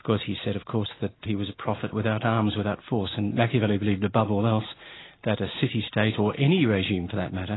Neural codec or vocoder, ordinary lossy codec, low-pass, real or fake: none; AAC, 16 kbps; 7.2 kHz; real